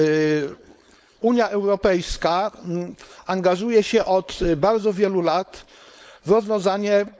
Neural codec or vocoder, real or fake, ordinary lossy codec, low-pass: codec, 16 kHz, 4.8 kbps, FACodec; fake; none; none